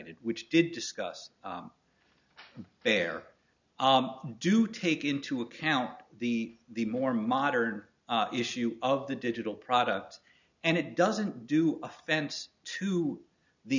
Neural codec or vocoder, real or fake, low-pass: none; real; 7.2 kHz